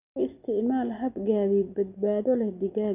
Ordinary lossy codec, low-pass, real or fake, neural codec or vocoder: none; 3.6 kHz; real; none